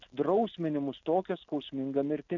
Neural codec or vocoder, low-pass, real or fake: none; 7.2 kHz; real